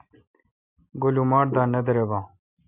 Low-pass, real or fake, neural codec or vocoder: 3.6 kHz; real; none